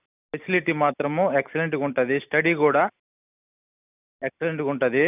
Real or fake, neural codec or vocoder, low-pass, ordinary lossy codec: real; none; 3.6 kHz; none